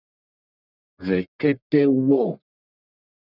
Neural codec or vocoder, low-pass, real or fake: codec, 44.1 kHz, 1.7 kbps, Pupu-Codec; 5.4 kHz; fake